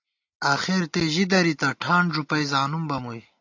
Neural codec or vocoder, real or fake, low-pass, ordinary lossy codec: none; real; 7.2 kHz; AAC, 48 kbps